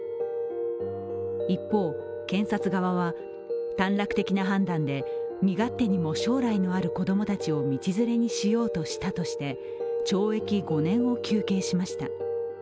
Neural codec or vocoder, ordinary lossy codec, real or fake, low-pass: none; none; real; none